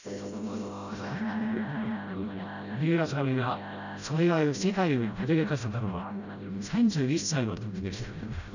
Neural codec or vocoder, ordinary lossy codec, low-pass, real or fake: codec, 16 kHz, 0.5 kbps, FreqCodec, smaller model; none; 7.2 kHz; fake